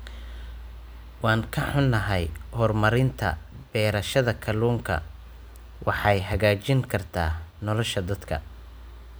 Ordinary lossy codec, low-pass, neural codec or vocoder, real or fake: none; none; none; real